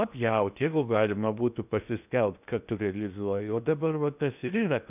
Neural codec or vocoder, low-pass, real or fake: codec, 16 kHz in and 24 kHz out, 0.8 kbps, FocalCodec, streaming, 65536 codes; 3.6 kHz; fake